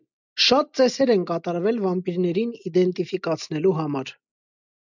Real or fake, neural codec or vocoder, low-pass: real; none; 7.2 kHz